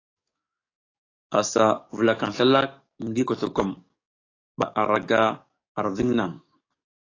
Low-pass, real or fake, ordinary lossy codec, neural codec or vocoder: 7.2 kHz; fake; AAC, 32 kbps; codec, 44.1 kHz, 7.8 kbps, DAC